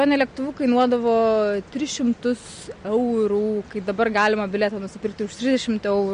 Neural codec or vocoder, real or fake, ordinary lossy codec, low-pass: none; real; MP3, 48 kbps; 9.9 kHz